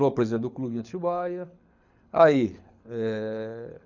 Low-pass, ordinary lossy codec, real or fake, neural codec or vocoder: 7.2 kHz; none; fake; codec, 24 kHz, 6 kbps, HILCodec